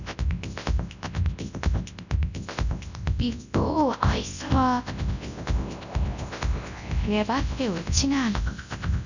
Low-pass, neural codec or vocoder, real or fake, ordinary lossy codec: 7.2 kHz; codec, 24 kHz, 0.9 kbps, WavTokenizer, large speech release; fake; none